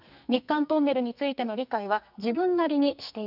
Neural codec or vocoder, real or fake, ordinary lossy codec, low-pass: codec, 44.1 kHz, 2.6 kbps, SNAC; fake; none; 5.4 kHz